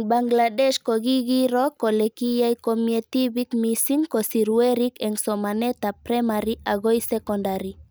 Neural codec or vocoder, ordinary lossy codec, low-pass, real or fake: none; none; none; real